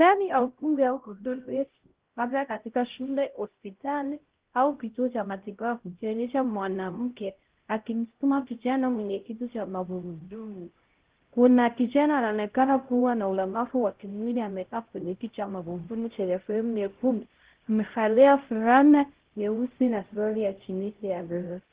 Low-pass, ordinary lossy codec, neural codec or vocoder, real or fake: 3.6 kHz; Opus, 16 kbps; codec, 16 kHz, 0.5 kbps, X-Codec, HuBERT features, trained on LibriSpeech; fake